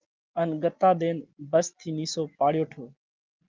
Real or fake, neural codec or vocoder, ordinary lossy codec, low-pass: real; none; Opus, 32 kbps; 7.2 kHz